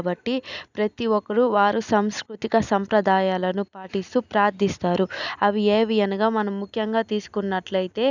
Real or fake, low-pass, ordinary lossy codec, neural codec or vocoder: real; 7.2 kHz; none; none